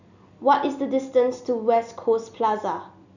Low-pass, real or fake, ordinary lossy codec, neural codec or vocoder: 7.2 kHz; real; none; none